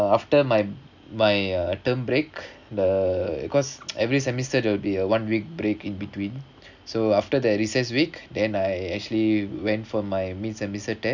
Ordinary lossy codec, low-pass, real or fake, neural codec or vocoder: none; 7.2 kHz; real; none